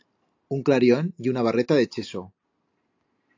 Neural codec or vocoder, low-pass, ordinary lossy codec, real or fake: none; 7.2 kHz; AAC, 48 kbps; real